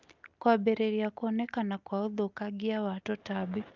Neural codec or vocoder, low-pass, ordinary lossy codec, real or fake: none; 7.2 kHz; Opus, 24 kbps; real